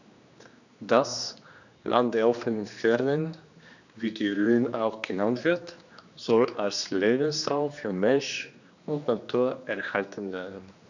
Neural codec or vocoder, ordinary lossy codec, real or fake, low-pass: codec, 16 kHz, 2 kbps, X-Codec, HuBERT features, trained on general audio; none; fake; 7.2 kHz